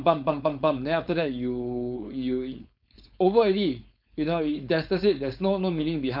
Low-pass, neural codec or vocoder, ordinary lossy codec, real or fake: 5.4 kHz; codec, 16 kHz, 4.8 kbps, FACodec; none; fake